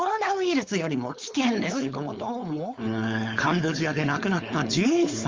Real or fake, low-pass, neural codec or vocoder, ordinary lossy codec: fake; 7.2 kHz; codec, 16 kHz, 4.8 kbps, FACodec; Opus, 32 kbps